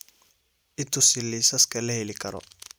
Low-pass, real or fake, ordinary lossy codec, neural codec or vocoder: none; real; none; none